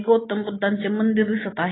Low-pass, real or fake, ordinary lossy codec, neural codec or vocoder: 7.2 kHz; real; AAC, 16 kbps; none